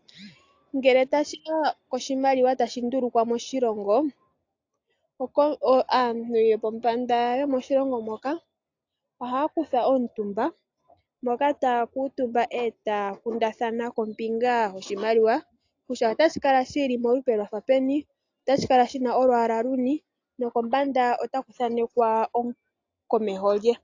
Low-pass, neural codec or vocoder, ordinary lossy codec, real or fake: 7.2 kHz; none; AAC, 48 kbps; real